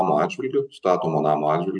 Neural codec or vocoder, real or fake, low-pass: none; real; 9.9 kHz